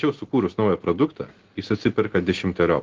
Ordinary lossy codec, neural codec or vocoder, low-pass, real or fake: Opus, 16 kbps; none; 7.2 kHz; real